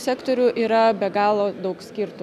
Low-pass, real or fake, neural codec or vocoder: 14.4 kHz; real; none